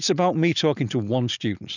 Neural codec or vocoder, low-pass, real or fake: none; 7.2 kHz; real